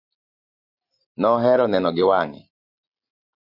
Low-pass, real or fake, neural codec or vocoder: 5.4 kHz; real; none